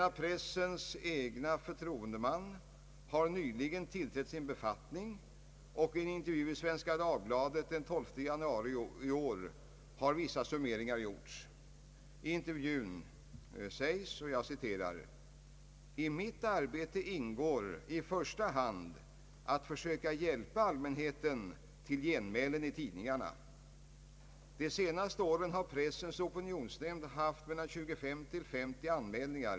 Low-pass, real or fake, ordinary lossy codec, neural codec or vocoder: none; real; none; none